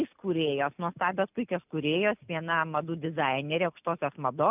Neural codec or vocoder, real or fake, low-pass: none; real; 3.6 kHz